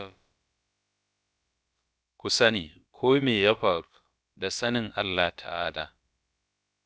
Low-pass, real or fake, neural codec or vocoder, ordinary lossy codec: none; fake; codec, 16 kHz, about 1 kbps, DyCAST, with the encoder's durations; none